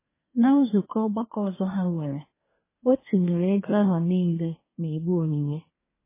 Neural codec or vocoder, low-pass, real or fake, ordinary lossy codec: codec, 24 kHz, 1 kbps, SNAC; 3.6 kHz; fake; MP3, 16 kbps